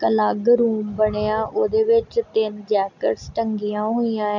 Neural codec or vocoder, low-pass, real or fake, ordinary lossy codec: none; 7.2 kHz; real; none